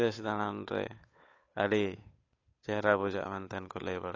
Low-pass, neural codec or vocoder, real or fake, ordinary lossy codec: 7.2 kHz; codec, 16 kHz, 8 kbps, FunCodec, trained on Chinese and English, 25 frames a second; fake; AAC, 32 kbps